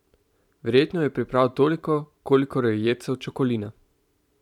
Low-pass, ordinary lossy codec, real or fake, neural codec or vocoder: 19.8 kHz; none; fake; vocoder, 44.1 kHz, 128 mel bands every 512 samples, BigVGAN v2